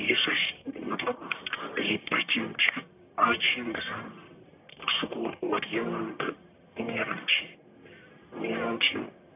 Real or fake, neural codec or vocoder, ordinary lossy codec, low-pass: fake; codec, 44.1 kHz, 1.7 kbps, Pupu-Codec; none; 3.6 kHz